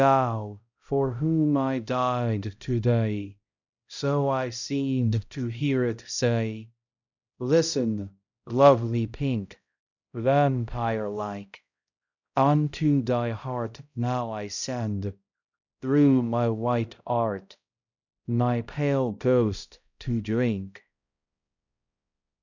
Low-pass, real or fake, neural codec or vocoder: 7.2 kHz; fake; codec, 16 kHz, 0.5 kbps, X-Codec, HuBERT features, trained on balanced general audio